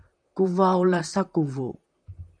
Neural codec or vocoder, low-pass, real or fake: vocoder, 44.1 kHz, 128 mel bands, Pupu-Vocoder; 9.9 kHz; fake